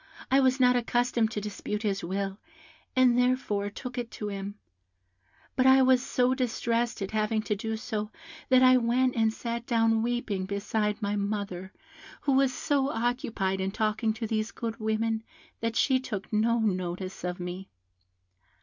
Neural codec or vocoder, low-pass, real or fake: none; 7.2 kHz; real